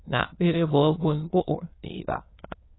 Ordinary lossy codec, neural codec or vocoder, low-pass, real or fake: AAC, 16 kbps; autoencoder, 22.05 kHz, a latent of 192 numbers a frame, VITS, trained on many speakers; 7.2 kHz; fake